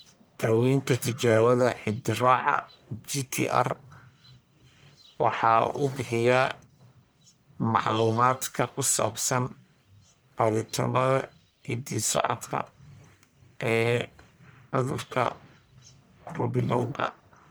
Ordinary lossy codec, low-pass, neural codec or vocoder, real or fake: none; none; codec, 44.1 kHz, 1.7 kbps, Pupu-Codec; fake